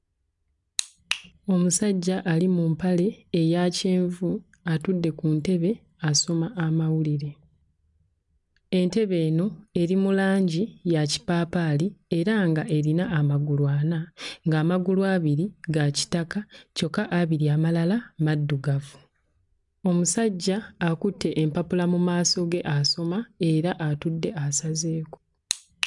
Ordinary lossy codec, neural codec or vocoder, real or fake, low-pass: none; none; real; 10.8 kHz